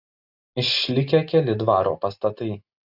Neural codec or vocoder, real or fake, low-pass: none; real; 5.4 kHz